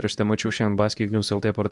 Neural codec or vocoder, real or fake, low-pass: codec, 24 kHz, 0.9 kbps, WavTokenizer, medium speech release version 2; fake; 10.8 kHz